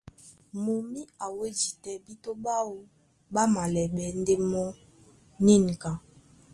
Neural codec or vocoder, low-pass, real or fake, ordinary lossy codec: none; 10.8 kHz; real; Opus, 24 kbps